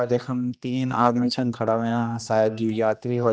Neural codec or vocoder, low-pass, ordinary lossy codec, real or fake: codec, 16 kHz, 1 kbps, X-Codec, HuBERT features, trained on general audio; none; none; fake